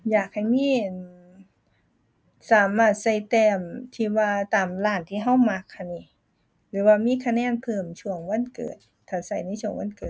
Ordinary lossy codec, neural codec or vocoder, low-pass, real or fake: none; none; none; real